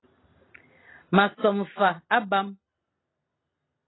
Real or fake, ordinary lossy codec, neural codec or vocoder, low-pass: real; AAC, 16 kbps; none; 7.2 kHz